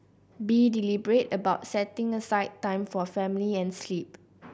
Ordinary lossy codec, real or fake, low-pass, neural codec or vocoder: none; real; none; none